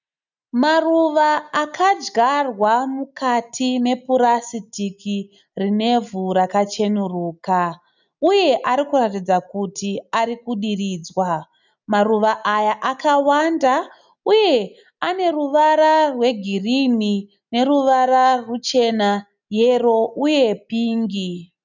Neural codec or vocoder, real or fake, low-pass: none; real; 7.2 kHz